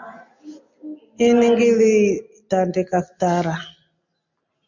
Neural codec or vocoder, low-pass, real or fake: none; 7.2 kHz; real